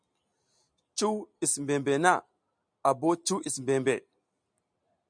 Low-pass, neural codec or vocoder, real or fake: 9.9 kHz; none; real